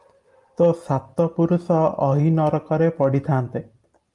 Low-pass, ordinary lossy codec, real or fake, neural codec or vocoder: 10.8 kHz; Opus, 32 kbps; real; none